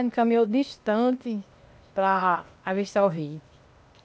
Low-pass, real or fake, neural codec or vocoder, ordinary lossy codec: none; fake; codec, 16 kHz, 0.8 kbps, ZipCodec; none